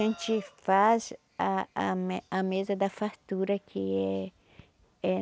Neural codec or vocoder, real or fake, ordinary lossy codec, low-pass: none; real; none; none